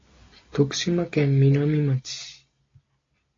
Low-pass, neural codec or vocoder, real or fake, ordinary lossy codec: 7.2 kHz; none; real; AAC, 32 kbps